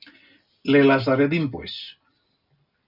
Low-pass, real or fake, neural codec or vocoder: 5.4 kHz; real; none